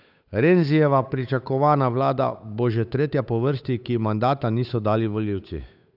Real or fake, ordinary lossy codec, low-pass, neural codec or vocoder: fake; none; 5.4 kHz; codec, 16 kHz, 8 kbps, FunCodec, trained on Chinese and English, 25 frames a second